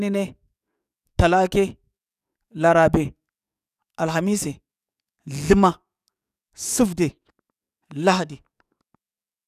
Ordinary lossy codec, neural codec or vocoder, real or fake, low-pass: none; codec, 44.1 kHz, 7.8 kbps, DAC; fake; 14.4 kHz